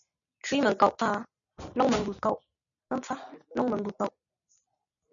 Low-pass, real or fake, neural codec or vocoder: 7.2 kHz; real; none